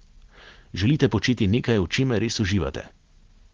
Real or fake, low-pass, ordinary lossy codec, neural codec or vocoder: real; 7.2 kHz; Opus, 16 kbps; none